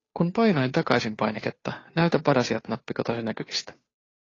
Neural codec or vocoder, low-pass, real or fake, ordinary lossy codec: codec, 16 kHz, 2 kbps, FunCodec, trained on Chinese and English, 25 frames a second; 7.2 kHz; fake; AAC, 32 kbps